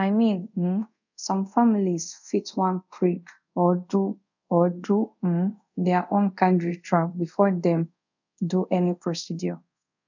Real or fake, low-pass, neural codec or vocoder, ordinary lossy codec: fake; 7.2 kHz; codec, 24 kHz, 0.5 kbps, DualCodec; none